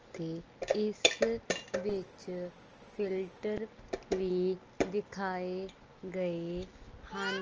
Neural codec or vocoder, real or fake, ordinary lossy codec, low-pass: none; real; Opus, 24 kbps; 7.2 kHz